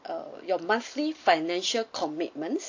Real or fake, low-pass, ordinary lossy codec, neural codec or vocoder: real; 7.2 kHz; AAC, 48 kbps; none